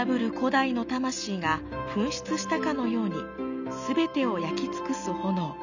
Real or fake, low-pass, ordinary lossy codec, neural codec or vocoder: real; 7.2 kHz; none; none